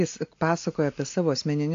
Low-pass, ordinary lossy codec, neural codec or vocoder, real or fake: 7.2 kHz; AAC, 48 kbps; none; real